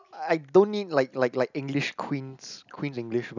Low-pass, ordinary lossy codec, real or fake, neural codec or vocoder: 7.2 kHz; none; real; none